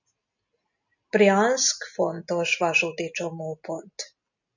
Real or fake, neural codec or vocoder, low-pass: real; none; 7.2 kHz